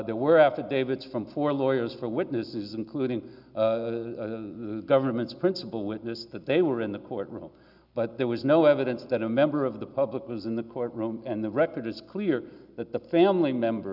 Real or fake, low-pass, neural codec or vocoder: real; 5.4 kHz; none